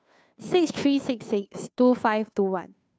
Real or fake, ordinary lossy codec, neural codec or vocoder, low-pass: fake; none; codec, 16 kHz, 2 kbps, FunCodec, trained on Chinese and English, 25 frames a second; none